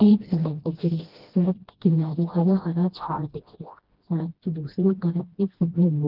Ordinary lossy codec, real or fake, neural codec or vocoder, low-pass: Opus, 16 kbps; fake; codec, 16 kHz, 1 kbps, FreqCodec, smaller model; 5.4 kHz